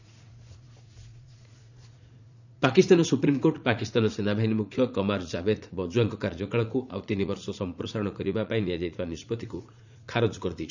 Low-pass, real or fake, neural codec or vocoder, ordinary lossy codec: 7.2 kHz; fake; vocoder, 44.1 kHz, 80 mel bands, Vocos; none